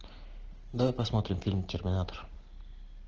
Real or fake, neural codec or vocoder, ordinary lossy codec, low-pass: real; none; Opus, 16 kbps; 7.2 kHz